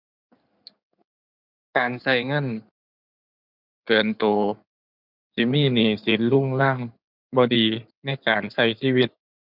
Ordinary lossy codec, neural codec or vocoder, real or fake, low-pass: none; codec, 16 kHz in and 24 kHz out, 2.2 kbps, FireRedTTS-2 codec; fake; 5.4 kHz